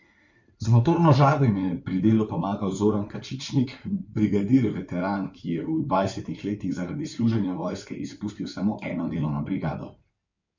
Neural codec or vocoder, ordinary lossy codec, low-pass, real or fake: codec, 16 kHz in and 24 kHz out, 2.2 kbps, FireRedTTS-2 codec; none; 7.2 kHz; fake